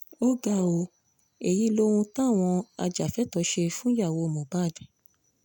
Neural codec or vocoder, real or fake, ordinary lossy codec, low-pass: none; real; none; 19.8 kHz